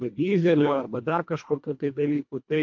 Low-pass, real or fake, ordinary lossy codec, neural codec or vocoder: 7.2 kHz; fake; MP3, 48 kbps; codec, 24 kHz, 1.5 kbps, HILCodec